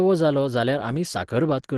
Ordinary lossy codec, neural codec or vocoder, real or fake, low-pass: Opus, 16 kbps; none; real; 10.8 kHz